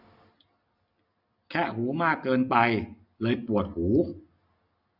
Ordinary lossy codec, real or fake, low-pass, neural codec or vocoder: none; real; 5.4 kHz; none